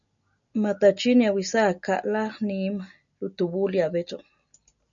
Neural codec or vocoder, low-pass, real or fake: none; 7.2 kHz; real